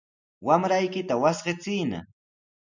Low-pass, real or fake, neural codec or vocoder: 7.2 kHz; real; none